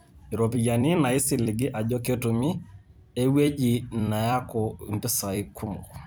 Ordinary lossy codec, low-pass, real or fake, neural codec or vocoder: none; none; fake; vocoder, 44.1 kHz, 128 mel bands every 256 samples, BigVGAN v2